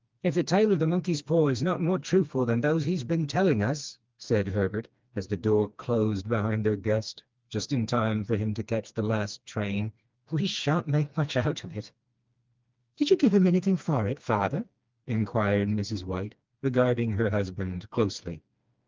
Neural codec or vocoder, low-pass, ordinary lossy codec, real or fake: codec, 16 kHz, 2 kbps, FreqCodec, smaller model; 7.2 kHz; Opus, 24 kbps; fake